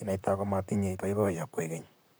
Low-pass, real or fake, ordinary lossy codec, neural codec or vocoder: none; fake; none; vocoder, 44.1 kHz, 128 mel bands, Pupu-Vocoder